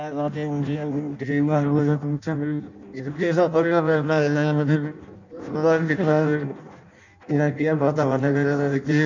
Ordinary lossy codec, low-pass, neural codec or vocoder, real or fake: none; 7.2 kHz; codec, 16 kHz in and 24 kHz out, 0.6 kbps, FireRedTTS-2 codec; fake